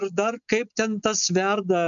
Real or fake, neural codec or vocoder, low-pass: real; none; 7.2 kHz